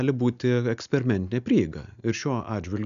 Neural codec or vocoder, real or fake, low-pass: none; real; 7.2 kHz